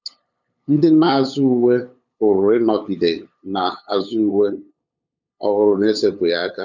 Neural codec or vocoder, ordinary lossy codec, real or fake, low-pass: codec, 16 kHz, 8 kbps, FunCodec, trained on LibriTTS, 25 frames a second; none; fake; 7.2 kHz